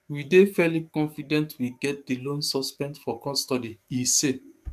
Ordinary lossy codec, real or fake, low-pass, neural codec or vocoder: MP3, 96 kbps; fake; 14.4 kHz; codec, 44.1 kHz, 7.8 kbps, DAC